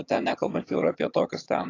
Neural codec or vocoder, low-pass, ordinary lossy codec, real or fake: vocoder, 22.05 kHz, 80 mel bands, HiFi-GAN; 7.2 kHz; AAC, 32 kbps; fake